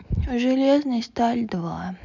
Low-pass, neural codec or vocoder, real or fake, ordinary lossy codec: 7.2 kHz; none; real; none